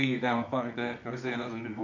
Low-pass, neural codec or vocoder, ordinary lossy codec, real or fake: 7.2 kHz; codec, 24 kHz, 0.9 kbps, WavTokenizer, medium music audio release; MP3, 64 kbps; fake